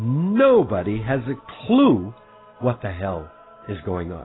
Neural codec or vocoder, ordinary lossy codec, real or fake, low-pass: none; AAC, 16 kbps; real; 7.2 kHz